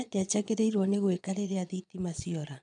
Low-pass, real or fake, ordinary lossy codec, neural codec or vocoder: 9.9 kHz; fake; none; vocoder, 22.05 kHz, 80 mel bands, Vocos